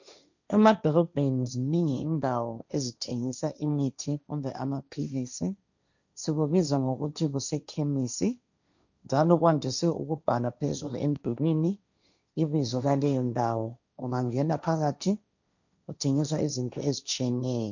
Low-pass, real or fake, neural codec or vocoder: 7.2 kHz; fake; codec, 16 kHz, 1.1 kbps, Voila-Tokenizer